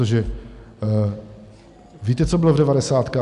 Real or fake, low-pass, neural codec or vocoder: real; 10.8 kHz; none